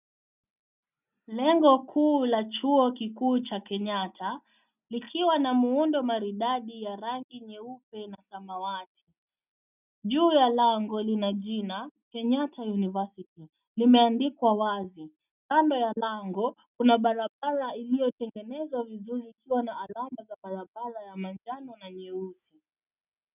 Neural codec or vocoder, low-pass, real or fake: none; 3.6 kHz; real